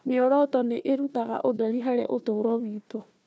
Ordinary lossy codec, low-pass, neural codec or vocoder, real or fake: none; none; codec, 16 kHz, 1 kbps, FunCodec, trained on Chinese and English, 50 frames a second; fake